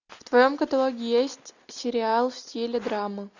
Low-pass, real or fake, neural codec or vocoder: 7.2 kHz; real; none